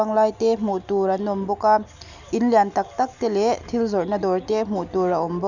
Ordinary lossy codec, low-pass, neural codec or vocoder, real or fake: none; 7.2 kHz; none; real